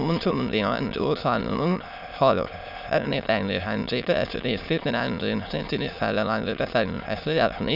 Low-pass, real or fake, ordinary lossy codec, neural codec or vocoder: 5.4 kHz; fake; none; autoencoder, 22.05 kHz, a latent of 192 numbers a frame, VITS, trained on many speakers